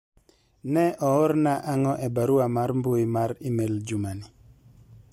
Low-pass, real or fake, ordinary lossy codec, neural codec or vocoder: 19.8 kHz; real; MP3, 64 kbps; none